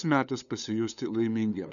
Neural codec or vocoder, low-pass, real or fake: codec, 16 kHz, 8 kbps, FunCodec, trained on LibriTTS, 25 frames a second; 7.2 kHz; fake